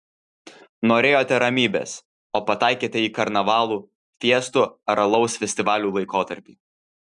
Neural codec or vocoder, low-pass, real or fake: none; 10.8 kHz; real